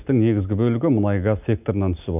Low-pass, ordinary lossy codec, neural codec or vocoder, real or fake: 3.6 kHz; none; none; real